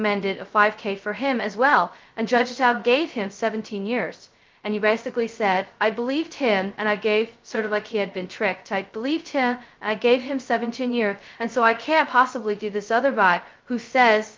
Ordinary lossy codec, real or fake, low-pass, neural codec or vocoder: Opus, 32 kbps; fake; 7.2 kHz; codec, 16 kHz, 0.2 kbps, FocalCodec